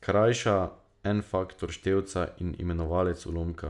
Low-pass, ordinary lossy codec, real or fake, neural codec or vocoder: 10.8 kHz; none; real; none